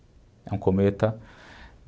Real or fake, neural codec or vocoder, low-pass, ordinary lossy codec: real; none; none; none